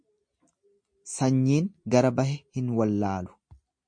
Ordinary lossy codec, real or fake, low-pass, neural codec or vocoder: MP3, 48 kbps; real; 9.9 kHz; none